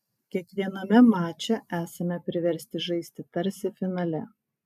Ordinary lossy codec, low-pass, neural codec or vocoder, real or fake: MP3, 96 kbps; 14.4 kHz; none; real